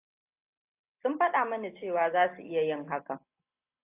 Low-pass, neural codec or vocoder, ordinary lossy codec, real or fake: 3.6 kHz; none; AAC, 32 kbps; real